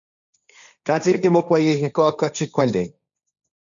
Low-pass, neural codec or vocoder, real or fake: 7.2 kHz; codec, 16 kHz, 1.1 kbps, Voila-Tokenizer; fake